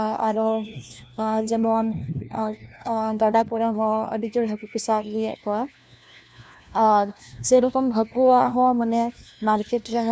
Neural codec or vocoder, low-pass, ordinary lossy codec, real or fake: codec, 16 kHz, 1 kbps, FunCodec, trained on LibriTTS, 50 frames a second; none; none; fake